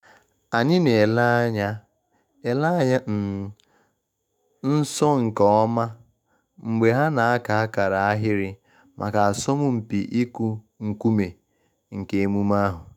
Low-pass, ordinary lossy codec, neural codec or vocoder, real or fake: 19.8 kHz; none; autoencoder, 48 kHz, 128 numbers a frame, DAC-VAE, trained on Japanese speech; fake